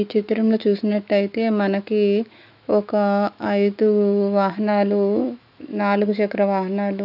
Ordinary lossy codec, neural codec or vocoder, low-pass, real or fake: none; autoencoder, 48 kHz, 128 numbers a frame, DAC-VAE, trained on Japanese speech; 5.4 kHz; fake